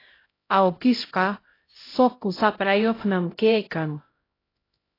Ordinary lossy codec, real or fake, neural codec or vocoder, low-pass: AAC, 24 kbps; fake; codec, 16 kHz, 0.5 kbps, X-Codec, HuBERT features, trained on LibriSpeech; 5.4 kHz